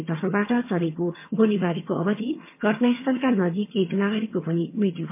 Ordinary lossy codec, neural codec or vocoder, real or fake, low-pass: MP3, 24 kbps; vocoder, 22.05 kHz, 80 mel bands, HiFi-GAN; fake; 3.6 kHz